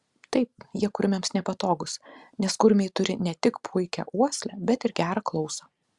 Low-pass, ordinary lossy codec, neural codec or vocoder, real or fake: 10.8 kHz; Opus, 64 kbps; none; real